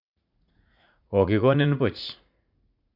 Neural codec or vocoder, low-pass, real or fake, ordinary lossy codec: vocoder, 44.1 kHz, 80 mel bands, Vocos; 5.4 kHz; fake; none